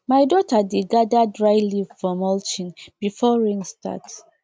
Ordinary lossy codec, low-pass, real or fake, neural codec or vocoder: none; none; real; none